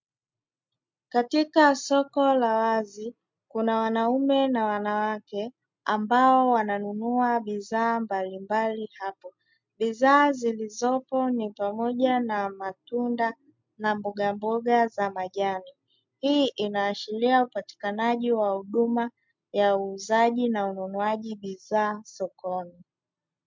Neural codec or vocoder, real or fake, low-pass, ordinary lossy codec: none; real; 7.2 kHz; MP3, 64 kbps